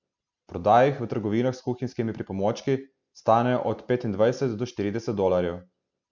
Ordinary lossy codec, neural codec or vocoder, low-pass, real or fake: none; none; 7.2 kHz; real